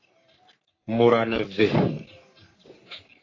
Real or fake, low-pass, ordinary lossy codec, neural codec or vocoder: fake; 7.2 kHz; AAC, 32 kbps; codec, 44.1 kHz, 3.4 kbps, Pupu-Codec